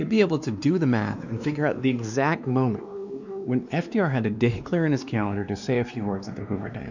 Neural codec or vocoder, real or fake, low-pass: codec, 16 kHz, 2 kbps, X-Codec, WavLM features, trained on Multilingual LibriSpeech; fake; 7.2 kHz